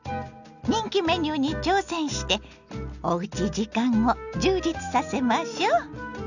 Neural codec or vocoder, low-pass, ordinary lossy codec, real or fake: none; 7.2 kHz; none; real